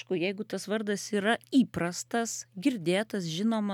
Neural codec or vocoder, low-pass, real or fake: none; 19.8 kHz; real